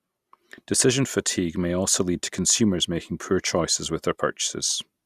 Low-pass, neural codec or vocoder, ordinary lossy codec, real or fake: 14.4 kHz; none; Opus, 64 kbps; real